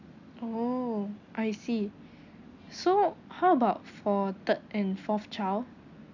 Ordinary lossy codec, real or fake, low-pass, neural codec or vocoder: none; real; 7.2 kHz; none